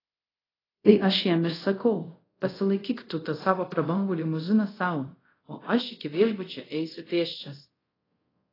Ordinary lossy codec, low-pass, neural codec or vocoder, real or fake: AAC, 24 kbps; 5.4 kHz; codec, 24 kHz, 0.5 kbps, DualCodec; fake